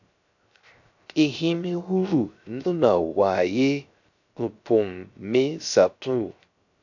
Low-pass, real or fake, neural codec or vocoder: 7.2 kHz; fake; codec, 16 kHz, 0.3 kbps, FocalCodec